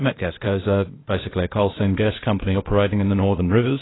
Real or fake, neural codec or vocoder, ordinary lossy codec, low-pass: fake; codec, 16 kHz, 0.8 kbps, ZipCodec; AAC, 16 kbps; 7.2 kHz